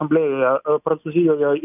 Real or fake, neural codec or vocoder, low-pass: real; none; 3.6 kHz